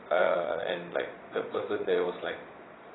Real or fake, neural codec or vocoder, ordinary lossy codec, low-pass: fake; vocoder, 22.05 kHz, 80 mel bands, Vocos; AAC, 16 kbps; 7.2 kHz